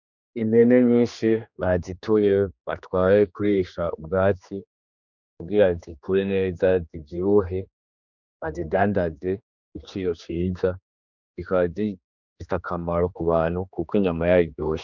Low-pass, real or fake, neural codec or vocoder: 7.2 kHz; fake; codec, 16 kHz, 2 kbps, X-Codec, HuBERT features, trained on general audio